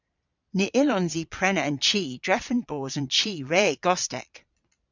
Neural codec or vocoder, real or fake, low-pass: vocoder, 22.05 kHz, 80 mel bands, Vocos; fake; 7.2 kHz